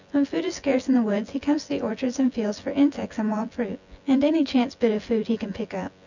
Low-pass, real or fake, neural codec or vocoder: 7.2 kHz; fake; vocoder, 24 kHz, 100 mel bands, Vocos